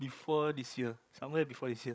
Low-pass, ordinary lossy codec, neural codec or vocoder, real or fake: none; none; codec, 16 kHz, 16 kbps, FreqCodec, larger model; fake